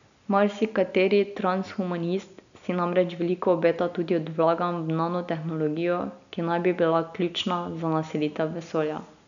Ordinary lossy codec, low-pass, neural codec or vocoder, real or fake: none; 7.2 kHz; none; real